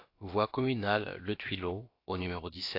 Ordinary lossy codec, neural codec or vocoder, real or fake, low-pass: AAC, 32 kbps; codec, 16 kHz, about 1 kbps, DyCAST, with the encoder's durations; fake; 5.4 kHz